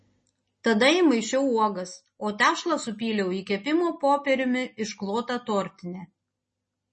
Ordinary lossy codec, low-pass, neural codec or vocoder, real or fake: MP3, 32 kbps; 10.8 kHz; none; real